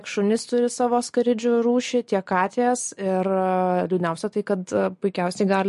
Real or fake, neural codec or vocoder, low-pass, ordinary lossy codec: real; none; 14.4 kHz; MP3, 48 kbps